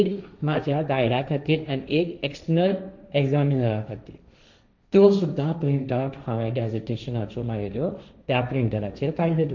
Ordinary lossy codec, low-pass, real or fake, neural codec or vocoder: none; 7.2 kHz; fake; codec, 16 kHz, 1.1 kbps, Voila-Tokenizer